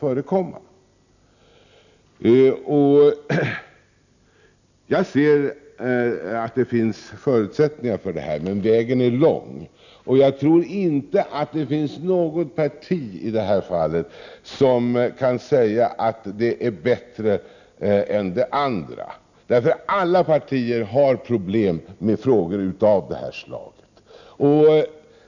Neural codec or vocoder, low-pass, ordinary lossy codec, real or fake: none; 7.2 kHz; none; real